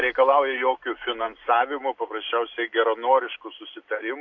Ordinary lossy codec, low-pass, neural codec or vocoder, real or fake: AAC, 48 kbps; 7.2 kHz; none; real